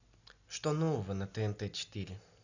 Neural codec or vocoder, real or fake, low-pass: none; real; 7.2 kHz